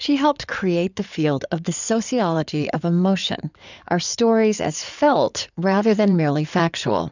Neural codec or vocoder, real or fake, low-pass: codec, 16 kHz in and 24 kHz out, 2.2 kbps, FireRedTTS-2 codec; fake; 7.2 kHz